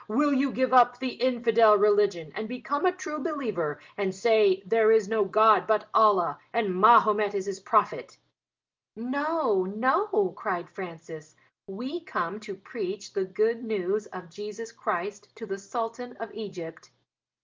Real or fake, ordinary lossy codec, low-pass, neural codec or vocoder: real; Opus, 32 kbps; 7.2 kHz; none